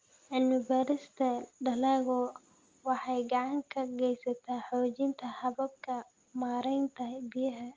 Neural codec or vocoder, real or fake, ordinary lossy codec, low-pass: none; real; Opus, 32 kbps; 7.2 kHz